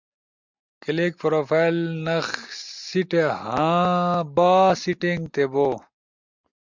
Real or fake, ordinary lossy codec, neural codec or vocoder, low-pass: real; MP3, 64 kbps; none; 7.2 kHz